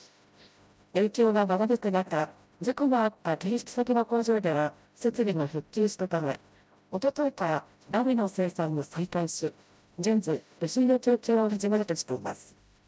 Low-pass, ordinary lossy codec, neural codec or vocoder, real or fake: none; none; codec, 16 kHz, 0.5 kbps, FreqCodec, smaller model; fake